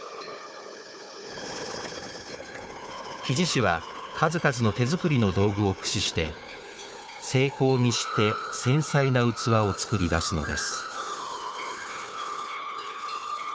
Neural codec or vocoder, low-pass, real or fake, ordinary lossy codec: codec, 16 kHz, 4 kbps, FunCodec, trained on Chinese and English, 50 frames a second; none; fake; none